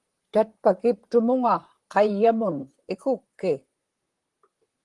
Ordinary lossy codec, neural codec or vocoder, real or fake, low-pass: Opus, 24 kbps; vocoder, 44.1 kHz, 128 mel bands, Pupu-Vocoder; fake; 10.8 kHz